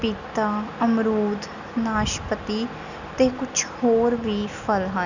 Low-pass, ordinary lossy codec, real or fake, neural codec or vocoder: 7.2 kHz; none; real; none